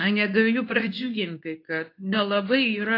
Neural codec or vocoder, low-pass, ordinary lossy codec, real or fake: codec, 24 kHz, 0.9 kbps, WavTokenizer, medium speech release version 2; 5.4 kHz; AAC, 32 kbps; fake